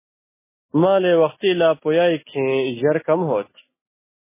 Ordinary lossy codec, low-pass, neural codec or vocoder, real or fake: MP3, 16 kbps; 3.6 kHz; none; real